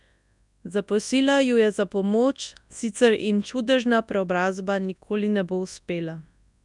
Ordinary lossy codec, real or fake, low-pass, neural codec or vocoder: none; fake; 10.8 kHz; codec, 24 kHz, 0.9 kbps, WavTokenizer, large speech release